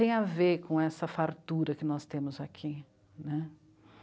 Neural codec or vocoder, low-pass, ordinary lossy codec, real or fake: none; none; none; real